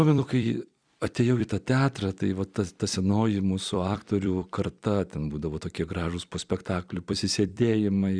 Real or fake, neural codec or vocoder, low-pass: fake; vocoder, 44.1 kHz, 128 mel bands every 256 samples, BigVGAN v2; 9.9 kHz